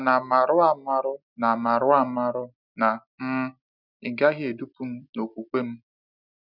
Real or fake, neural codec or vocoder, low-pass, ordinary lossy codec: real; none; 5.4 kHz; none